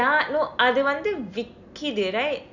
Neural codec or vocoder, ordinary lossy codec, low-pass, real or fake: none; none; 7.2 kHz; real